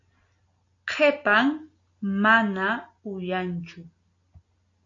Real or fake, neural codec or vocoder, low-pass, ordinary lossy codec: real; none; 7.2 kHz; AAC, 48 kbps